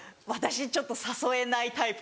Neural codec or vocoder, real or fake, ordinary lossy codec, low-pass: none; real; none; none